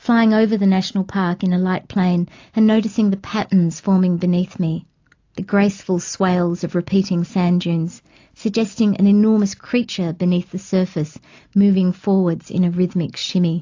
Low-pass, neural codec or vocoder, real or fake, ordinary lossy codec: 7.2 kHz; none; real; AAC, 48 kbps